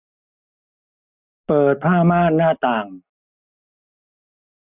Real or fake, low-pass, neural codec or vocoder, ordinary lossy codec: real; 3.6 kHz; none; none